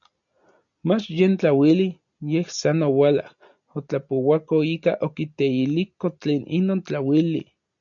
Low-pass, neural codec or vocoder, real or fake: 7.2 kHz; none; real